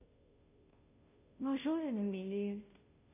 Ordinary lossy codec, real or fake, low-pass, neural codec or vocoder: none; fake; 3.6 kHz; codec, 16 kHz, 0.5 kbps, FunCodec, trained on Chinese and English, 25 frames a second